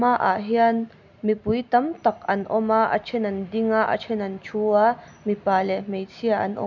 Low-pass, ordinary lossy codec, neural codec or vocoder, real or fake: 7.2 kHz; none; none; real